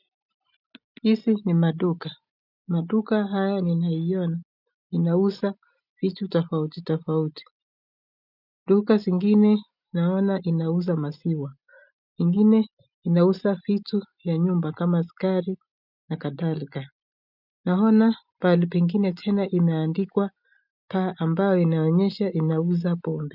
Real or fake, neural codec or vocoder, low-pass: real; none; 5.4 kHz